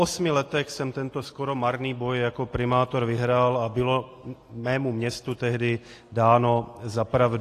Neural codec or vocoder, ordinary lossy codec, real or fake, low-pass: none; AAC, 48 kbps; real; 14.4 kHz